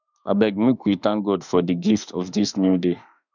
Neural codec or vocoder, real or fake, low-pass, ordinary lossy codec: autoencoder, 48 kHz, 32 numbers a frame, DAC-VAE, trained on Japanese speech; fake; 7.2 kHz; none